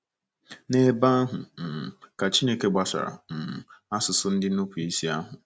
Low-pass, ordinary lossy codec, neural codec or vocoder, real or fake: none; none; none; real